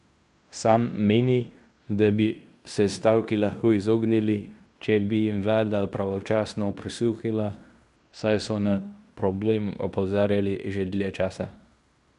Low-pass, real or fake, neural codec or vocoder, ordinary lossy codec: 10.8 kHz; fake; codec, 16 kHz in and 24 kHz out, 0.9 kbps, LongCat-Audio-Codec, fine tuned four codebook decoder; none